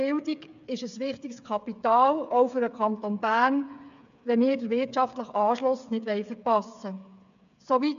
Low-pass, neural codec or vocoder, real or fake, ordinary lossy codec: 7.2 kHz; codec, 16 kHz, 8 kbps, FreqCodec, smaller model; fake; AAC, 96 kbps